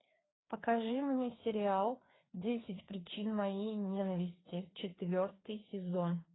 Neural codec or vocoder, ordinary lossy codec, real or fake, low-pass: codec, 16 kHz, 2 kbps, FreqCodec, larger model; AAC, 16 kbps; fake; 7.2 kHz